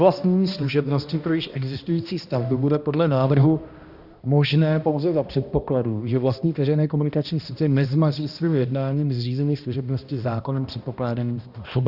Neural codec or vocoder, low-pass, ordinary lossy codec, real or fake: codec, 16 kHz, 1 kbps, X-Codec, HuBERT features, trained on balanced general audio; 5.4 kHz; Opus, 64 kbps; fake